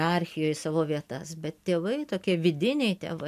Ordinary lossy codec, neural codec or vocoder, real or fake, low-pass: AAC, 96 kbps; none; real; 14.4 kHz